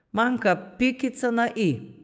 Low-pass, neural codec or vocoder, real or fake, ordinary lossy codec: none; codec, 16 kHz, 6 kbps, DAC; fake; none